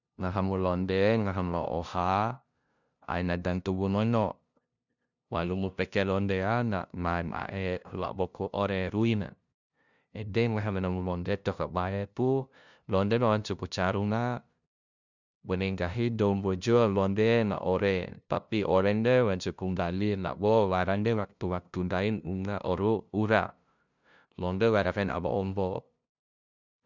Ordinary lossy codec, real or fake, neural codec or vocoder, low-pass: none; fake; codec, 16 kHz, 0.5 kbps, FunCodec, trained on LibriTTS, 25 frames a second; 7.2 kHz